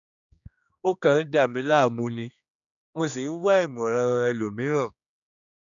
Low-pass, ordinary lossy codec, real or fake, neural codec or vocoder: 7.2 kHz; none; fake; codec, 16 kHz, 2 kbps, X-Codec, HuBERT features, trained on general audio